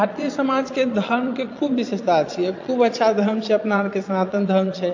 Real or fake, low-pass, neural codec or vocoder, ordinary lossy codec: fake; 7.2 kHz; vocoder, 22.05 kHz, 80 mel bands, Vocos; AAC, 48 kbps